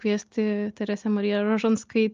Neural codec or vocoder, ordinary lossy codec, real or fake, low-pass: none; Opus, 24 kbps; real; 7.2 kHz